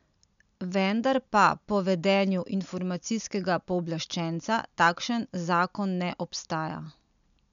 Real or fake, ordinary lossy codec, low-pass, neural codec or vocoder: real; MP3, 96 kbps; 7.2 kHz; none